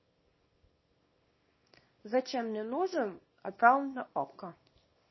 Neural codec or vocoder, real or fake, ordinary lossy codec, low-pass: codec, 24 kHz, 0.9 kbps, WavTokenizer, small release; fake; MP3, 24 kbps; 7.2 kHz